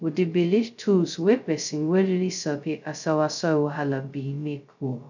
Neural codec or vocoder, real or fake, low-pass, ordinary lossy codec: codec, 16 kHz, 0.2 kbps, FocalCodec; fake; 7.2 kHz; none